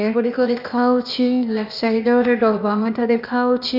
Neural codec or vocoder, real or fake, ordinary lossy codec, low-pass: codec, 16 kHz, 0.8 kbps, ZipCodec; fake; none; 5.4 kHz